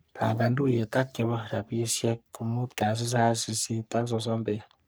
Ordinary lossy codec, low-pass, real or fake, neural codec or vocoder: none; none; fake; codec, 44.1 kHz, 3.4 kbps, Pupu-Codec